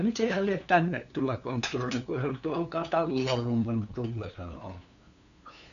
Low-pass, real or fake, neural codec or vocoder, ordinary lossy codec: 7.2 kHz; fake; codec, 16 kHz, 2 kbps, FunCodec, trained on LibriTTS, 25 frames a second; none